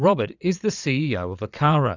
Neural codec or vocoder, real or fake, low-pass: vocoder, 22.05 kHz, 80 mel bands, Vocos; fake; 7.2 kHz